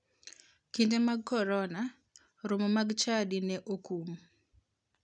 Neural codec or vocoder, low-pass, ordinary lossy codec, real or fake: none; 9.9 kHz; none; real